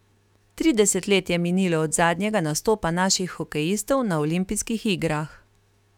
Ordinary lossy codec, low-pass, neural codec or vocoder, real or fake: none; 19.8 kHz; autoencoder, 48 kHz, 128 numbers a frame, DAC-VAE, trained on Japanese speech; fake